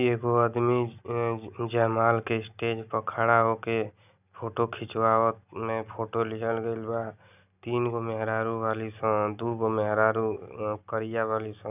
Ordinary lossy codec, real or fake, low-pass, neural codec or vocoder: none; real; 3.6 kHz; none